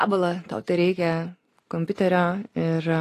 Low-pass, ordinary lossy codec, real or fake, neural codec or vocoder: 14.4 kHz; AAC, 48 kbps; real; none